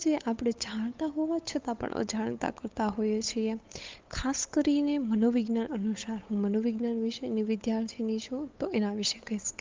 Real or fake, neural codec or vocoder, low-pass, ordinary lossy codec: fake; codec, 16 kHz, 8 kbps, FunCodec, trained on Chinese and English, 25 frames a second; 7.2 kHz; Opus, 32 kbps